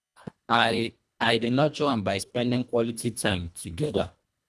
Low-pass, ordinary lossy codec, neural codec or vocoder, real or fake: none; none; codec, 24 kHz, 1.5 kbps, HILCodec; fake